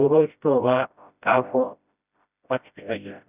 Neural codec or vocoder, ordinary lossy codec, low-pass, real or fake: codec, 16 kHz, 0.5 kbps, FreqCodec, smaller model; none; 3.6 kHz; fake